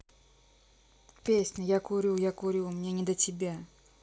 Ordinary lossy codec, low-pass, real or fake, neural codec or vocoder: none; none; real; none